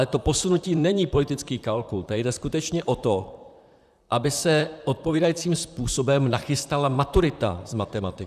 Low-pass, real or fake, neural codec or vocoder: 14.4 kHz; fake; vocoder, 44.1 kHz, 128 mel bands, Pupu-Vocoder